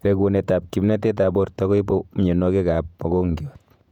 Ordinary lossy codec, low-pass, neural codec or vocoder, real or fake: none; 19.8 kHz; vocoder, 48 kHz, 128 mel bands, Vocos; fake